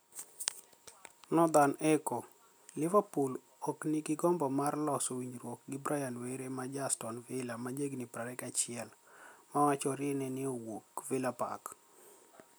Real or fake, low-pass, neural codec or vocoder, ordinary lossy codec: real; none; none; none